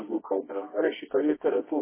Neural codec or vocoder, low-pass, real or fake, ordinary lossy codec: codec, 24 kHz, 0.9 kbps, WavTokenizer, medium music audio release; 3.6 kHz; fake; MP3, 16 kbps